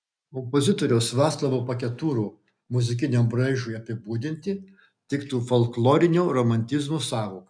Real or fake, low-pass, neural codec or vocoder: real; 9.9 kHz; none